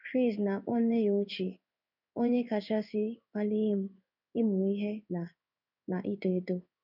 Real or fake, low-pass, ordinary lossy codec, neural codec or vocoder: fake; 5.4 kHz; none; codec, 16 kHz in and 24 kHz out, 1 kbps, XY-Tokenizer